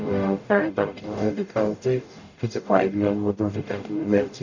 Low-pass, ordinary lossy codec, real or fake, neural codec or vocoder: 7.2 kHz; none; fake; codec, 44.1 kHz, 0.9 kbps, DAC